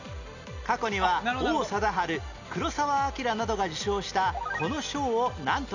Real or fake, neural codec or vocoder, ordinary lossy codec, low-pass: real; none; none; 7.2 kHz